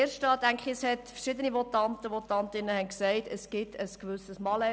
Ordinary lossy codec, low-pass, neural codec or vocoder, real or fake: none; none; none; real